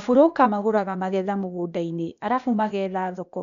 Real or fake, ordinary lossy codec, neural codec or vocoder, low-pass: fake; none; codec, 16 kHz, 0.8 kbps, ZipCodec; 7.2 kHz